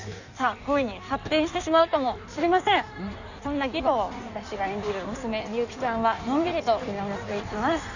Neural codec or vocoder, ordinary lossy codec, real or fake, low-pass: codec, 16 kHz in and 24 kHz out, 1.1 kbps, FireRedTTS-2 codec; none; fake; 7.2 kHz